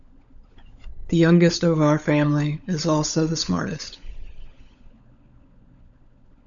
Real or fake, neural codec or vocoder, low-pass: fake; codec, 16 kHz, 16 kbps, FunCodec, trained on LibriTTS, 50 frames a second; 7.2 kHz